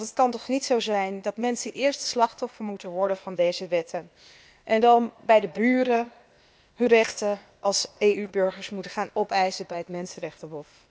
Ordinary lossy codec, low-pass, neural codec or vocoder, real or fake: none; none; codec, 16 kHz, 0.8 kbps, ZipCodec; fake